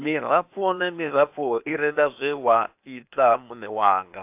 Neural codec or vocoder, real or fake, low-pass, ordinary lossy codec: codec, 16 kHz, 0.8 kbps, ZipCodec; fake; 3.6 kHz; AAC, 32 kbps